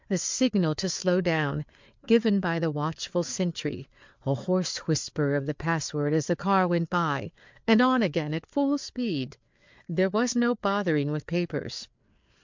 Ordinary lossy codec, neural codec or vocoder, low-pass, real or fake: MP3, 64 kbps; codec, 16 kHz, 4 kbps, FreqCodec, larger model; 7.2 kHz; fake